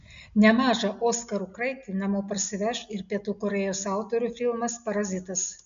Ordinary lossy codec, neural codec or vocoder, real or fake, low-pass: MP3, 64 kbps; none; real; 7.2 kHz